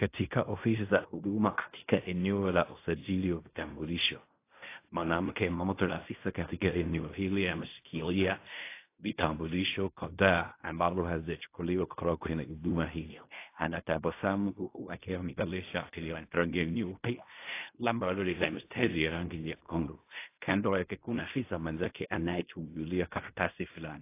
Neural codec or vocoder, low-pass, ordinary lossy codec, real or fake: codec, 16 kHz in and 24 kHz out, 0.4 kbps, LongCat-Audio-Codec, fine tuned four codebook decoder; 3.6 kHz; AAC, 24 kbps; fake